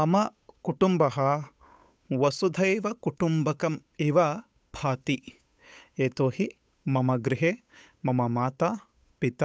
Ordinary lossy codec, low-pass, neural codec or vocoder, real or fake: none; none; codec, 16 kHz, 8 kbps, FunCodec, trained on Chinese and English, 25 frames a second; fake